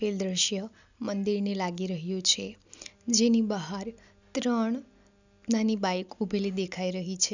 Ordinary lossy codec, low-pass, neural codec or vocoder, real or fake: none; 7.2 kHz; none; real